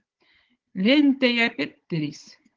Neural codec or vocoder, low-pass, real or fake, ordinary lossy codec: codec, 16 kHz, 16 kbps, FunCodec, trained on Chinese and English, 50 frames a second; 7.2 kHz; fake; Opus, 16 kbps